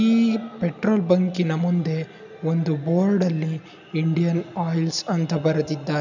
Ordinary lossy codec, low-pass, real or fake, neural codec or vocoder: none; 7.2 kHz; real; none